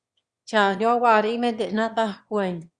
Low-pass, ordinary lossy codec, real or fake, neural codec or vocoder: 9.9 kHz; Opus, 64 kbps; fake; autoencoder, 22.05 kHz, a latent of 192 numbers a frame, VITS, trained on one speaker